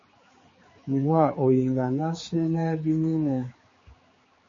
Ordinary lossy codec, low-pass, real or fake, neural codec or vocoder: MP3, 32 kbps; 7.2 kHz; fake; codec, 16 kHz, 4 kbps, X-Codec, HuBERT features, trained on general audio